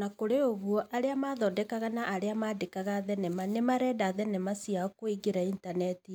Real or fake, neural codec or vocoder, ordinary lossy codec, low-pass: real; none; none; none